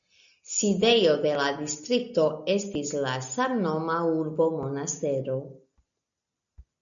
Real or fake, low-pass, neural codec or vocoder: real; 7.2 kHz; none